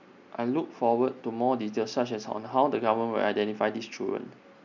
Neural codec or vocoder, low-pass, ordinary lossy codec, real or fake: none; 7.2 kHz; none; real